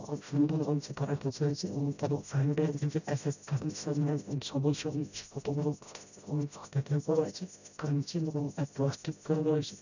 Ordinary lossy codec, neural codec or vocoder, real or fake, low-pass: none; codec, 16 kHz, 0.5 kbps, FreqCodec, smaller model; fake; 7.2 kHz